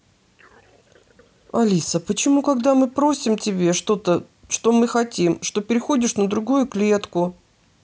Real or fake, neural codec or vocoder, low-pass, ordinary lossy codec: real; none; none; none